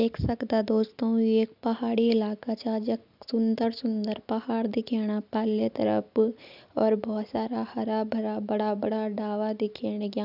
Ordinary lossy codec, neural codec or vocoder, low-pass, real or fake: none; none; 5.4 kHz; real